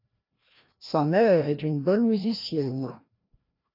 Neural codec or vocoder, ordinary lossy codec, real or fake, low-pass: codec, 16 kHz, 1 kbps, FreqCodec, larger model; AAC, 32 kbps; fake; 5.4 kHz